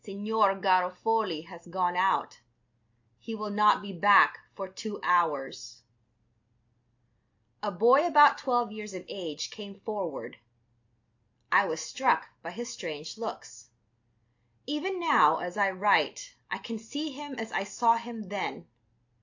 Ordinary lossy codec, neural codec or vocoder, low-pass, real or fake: AAC, 48 kbps; none; 7.2 kHz; real